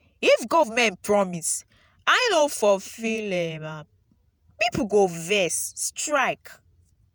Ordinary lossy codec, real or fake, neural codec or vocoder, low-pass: none; fake; vocoder, 48 kHz, 128 mel bands, Vocos; none